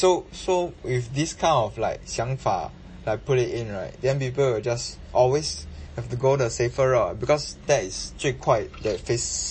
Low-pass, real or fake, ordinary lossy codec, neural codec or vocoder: 9.9 kHz; real; MP3, 32 kbps; none